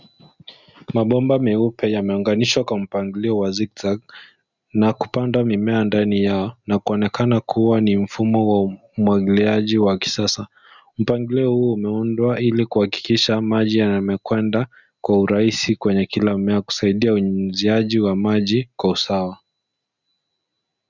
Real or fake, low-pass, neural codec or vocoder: real; 7.2 kHz; none